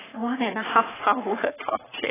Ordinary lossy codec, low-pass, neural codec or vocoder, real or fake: AAC, 16 kbps; 3.6 kHz; codec, 44.1 kHz, 3.4 kbps, Pupu-Codec; fake